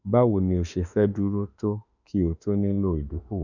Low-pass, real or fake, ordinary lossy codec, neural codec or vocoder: 7.2 kHz; fake; none; autoencoder, 48 kHz, 32 numbers a frame, DAC-VAE, trained on Japanese speech